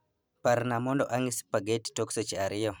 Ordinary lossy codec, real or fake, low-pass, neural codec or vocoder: none; fake; none; vocoder, 44.1 kHz, 128 mel bands every 512 samples, BigVGAN v2